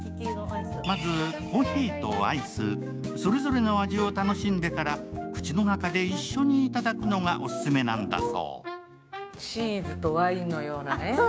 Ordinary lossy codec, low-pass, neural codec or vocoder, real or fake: none; none; codec, 16 kHz, 6 kbps, DAC; fake